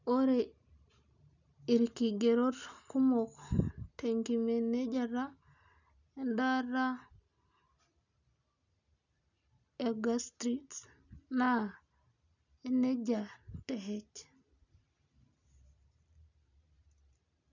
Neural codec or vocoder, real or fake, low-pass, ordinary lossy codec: none; real; 7.2 kHz; none